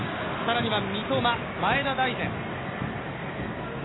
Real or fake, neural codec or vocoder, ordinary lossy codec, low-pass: real; none; AAC, 16 kbps; 7.2 kHz